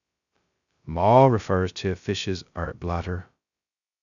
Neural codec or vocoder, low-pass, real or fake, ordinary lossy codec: codec, 16 kHz, 0.2 kbps, FocalCodec; 7.2 kHz; fake; none